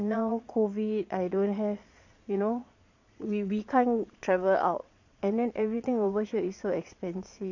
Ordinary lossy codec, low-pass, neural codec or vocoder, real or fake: none; 7.2 kHz; vocoder, 22.05 kHz, 80 mel bands, Vocos; fake